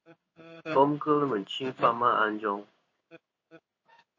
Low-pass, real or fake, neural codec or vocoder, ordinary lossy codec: 7.2 kHz; fake; codec, 16 kHz in and 24 kHz out, 1 kbps, XY-Tokenizer; MP3, 32 kbps